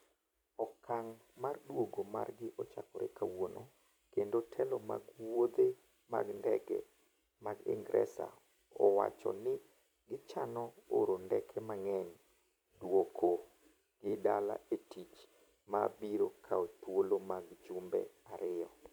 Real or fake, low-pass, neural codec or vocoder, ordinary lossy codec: fake; none; vocoder, 44.1 kHz, 128 mel bands every 256 samples, BigVGAN v2; none